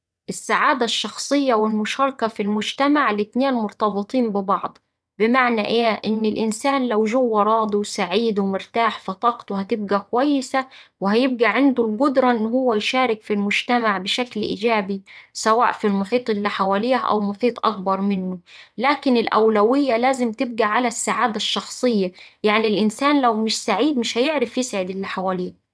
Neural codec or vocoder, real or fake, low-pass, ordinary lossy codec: vocoder, 22.05 kHz, 80 mel bands, WaveNeXt; fake; none; none